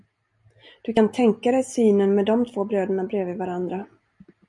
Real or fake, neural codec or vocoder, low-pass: real; none; 10.8 kHz